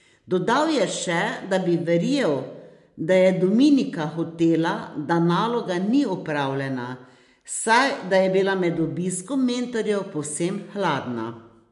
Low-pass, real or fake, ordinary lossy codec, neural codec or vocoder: 10.8 kHz; real; MP3, 64 kbps; none